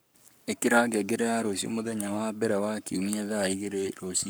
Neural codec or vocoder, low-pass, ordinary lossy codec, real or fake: codec, 44.1 kHz, 7.8 kbps, Pupu-Codec; none; none; fake